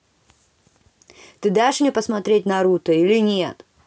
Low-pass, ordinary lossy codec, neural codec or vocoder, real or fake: none; none; none; real